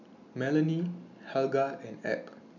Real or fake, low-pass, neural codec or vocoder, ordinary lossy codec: real; 7.2 kHz; none; none